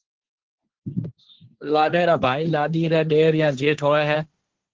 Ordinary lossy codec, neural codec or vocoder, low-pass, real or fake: Opus, 16 kbps; codec, 16 kHz, 1.1 kbps, Voila-Tokenizer; 7.2 kHz; fake